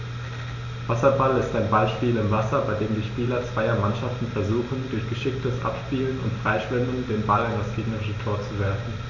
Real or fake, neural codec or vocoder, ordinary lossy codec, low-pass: real; none; none; 7.2 kHz